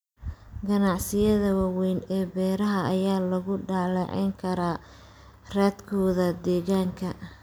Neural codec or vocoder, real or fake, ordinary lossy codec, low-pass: none; real; none; none